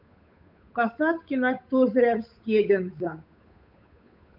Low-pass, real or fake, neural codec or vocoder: 5.4 kHz; fake; codec, 16 kHz, 8 kbps, FunCodec, trained on Chinese and English, 25 frames a second